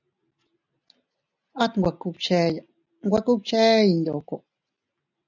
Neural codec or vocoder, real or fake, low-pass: none; real; 7.2 kHz